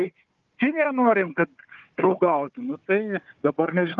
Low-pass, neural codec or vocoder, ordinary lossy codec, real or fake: 7.2 kHz; codec, 16 kHz, 4 kbps, FunCodec, trained on Chinese and English, 50 frames a second; Opus, 32 kbps; fake